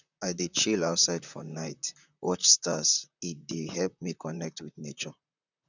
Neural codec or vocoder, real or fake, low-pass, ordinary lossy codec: vocoder, 44.1 kHz, 128 mel bands, Pupu-Vocoder; fake; 7.2 kHz; none